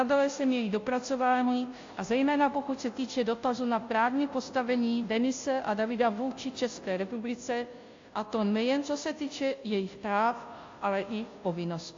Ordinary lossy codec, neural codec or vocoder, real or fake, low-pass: AAC, 48 kbps; codec, 16 kHz, 0.5 kbps, FunCodec, trained on Chinese and English, 25 frames a second; fake; 7.2 kHz